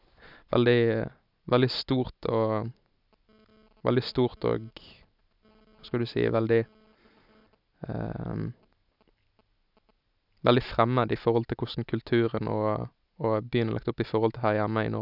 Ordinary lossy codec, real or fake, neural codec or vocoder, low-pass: none; real; none; 5.4 kHz